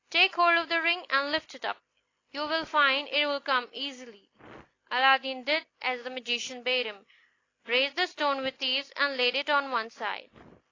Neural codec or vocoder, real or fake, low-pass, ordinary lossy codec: none; real; 7.2 kHz; AAC, 32 kbps